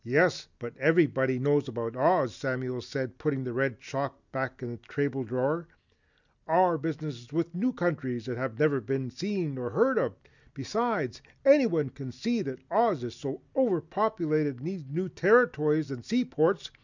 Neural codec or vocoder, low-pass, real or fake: none; 7.2 kHz; real